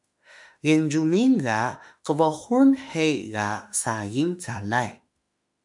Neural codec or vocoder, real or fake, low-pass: autoencoder, 48 kHz, 32 numbers a frame, DAC-VAE, trained on Japanese speech; fake; 10.8 kHz